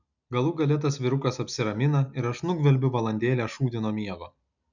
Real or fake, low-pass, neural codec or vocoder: real; 7.2 kHz; none